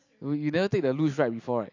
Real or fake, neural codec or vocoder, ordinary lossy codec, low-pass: real; none; MP3, 48 kbps; 7.2 kHz